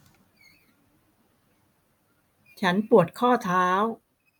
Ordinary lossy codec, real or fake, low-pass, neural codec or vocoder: none; real; none; none